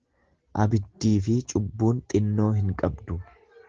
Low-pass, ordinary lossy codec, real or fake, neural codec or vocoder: 7.2 kHz; Opus, 16 kbps; real; none